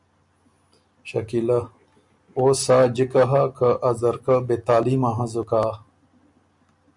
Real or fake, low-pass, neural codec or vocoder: real; 10.8 kHz; none